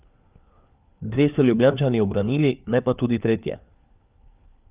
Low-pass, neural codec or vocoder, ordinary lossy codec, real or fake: 3.6 kHz; codec, 16 kHz, 4 kbps, FunCodec, trained on LibriTTS, 50 frames a second; Opus, 24 kbps; fake